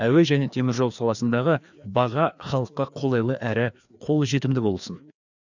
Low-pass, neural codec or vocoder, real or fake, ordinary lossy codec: 7.2 kHz; codec, 16 kHz, 2 kbps, FreqCodec, larger model; fake; none